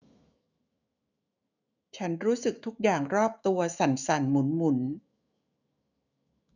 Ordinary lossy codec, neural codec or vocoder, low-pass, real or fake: none; autoencoder, 48 kHz, 128 numbers a frame, DAC-VAE, trained on Japanese speech; 7.2 kHz; fake